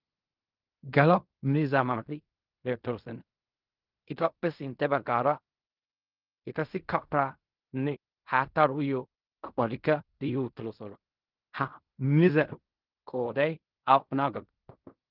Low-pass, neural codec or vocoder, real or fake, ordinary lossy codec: 5.4 kHz; codec, 16 kHz in and 24 kHz out, 0.4 kbps, LongCat-Audio-Codec, fine tuned four codebook decoder; fake; Opus, 24 kbps